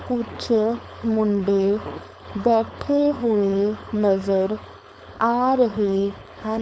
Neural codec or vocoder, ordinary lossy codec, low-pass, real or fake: codec, 16 kHz, 4.8 kbps, FACodec; none; none; fake